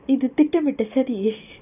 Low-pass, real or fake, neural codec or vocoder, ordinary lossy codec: 3.6 kHz; real; none; none